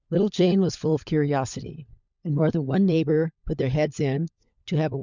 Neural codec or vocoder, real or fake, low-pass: codec, 16 kHz, 16 kbps, FunCodec, trained on LibriTTS, 50 frames a second; fake; 7.2 kHz